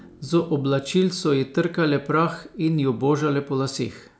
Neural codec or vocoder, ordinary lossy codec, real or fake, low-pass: none; none; real; none